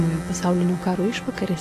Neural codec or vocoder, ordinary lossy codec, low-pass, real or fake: none; AAC, 48 kbps; 14.4 kHz; real